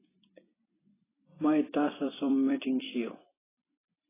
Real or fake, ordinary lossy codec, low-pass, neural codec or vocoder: fake; AAC, 16 kbps; 3.6 kHz; vocoder, 44.1 kHz, 128 mel bands every 256 samples, BigVGAN v2